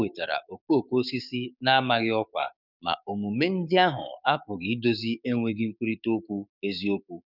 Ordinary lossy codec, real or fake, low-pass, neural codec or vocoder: none; fake; 5.4 kHz; codec, 16 kHz, 6 kbps, DAC